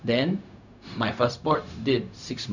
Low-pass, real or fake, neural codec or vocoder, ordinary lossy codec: 7.2 kHz; fake; codec, 16 kHz, 0.4 kbps, LongCat-Audio-Codec; none